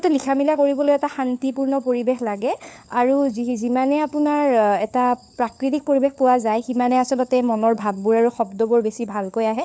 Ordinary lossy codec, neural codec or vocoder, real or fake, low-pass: none; codec, 16 kHz, 4 kbps, FunCodec, trained on LibriTTS, 50 frames a second; fake; none